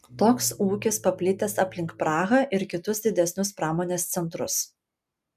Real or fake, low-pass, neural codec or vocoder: real; 14.4 kHz; none